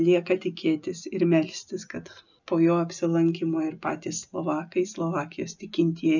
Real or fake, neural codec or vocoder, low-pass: real; none; 7.2 kHz